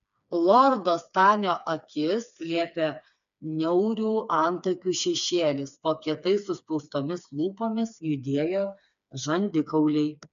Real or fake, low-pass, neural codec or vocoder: fake; 7.2 kHz; codec, 16 kHz, 4 kbps, FreqCodec, smaller model